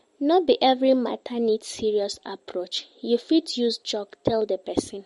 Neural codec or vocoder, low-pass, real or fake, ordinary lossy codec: vocoder, 44.1 kHz, 128 mel bands every 512 samples, BigVGAN v2; 19.8 kHz; fake; MP3, 48 kbps